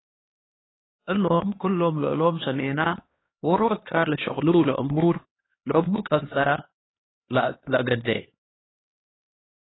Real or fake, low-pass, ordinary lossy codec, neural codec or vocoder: fake; 7.2 kHz; AAC, 16 kbps; codec, 16 kHz, 2 kbps, X-Codec, HuBERT features, trained on LibriSpeech